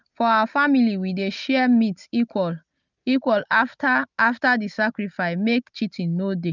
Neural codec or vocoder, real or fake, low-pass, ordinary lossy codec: none; real; 7.2 kHz; none